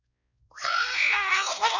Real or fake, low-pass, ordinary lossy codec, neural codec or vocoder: fake; 7.2 kHz; none; codec, 16 kHz, 1 kbps, X-Codec, WavLM features, trained on Multilingual LibriSpeech